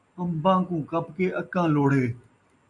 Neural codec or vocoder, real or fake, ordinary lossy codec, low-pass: none; real; MP3, 64 kbps; 10.8 kHz